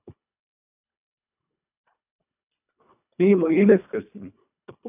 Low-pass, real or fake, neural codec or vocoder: 3.6 kHz; fake; codec, 24 kHz, 1.5 kbps, HILCodec